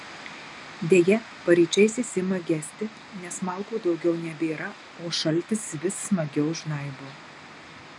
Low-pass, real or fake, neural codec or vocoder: 10.8 kHz; real; none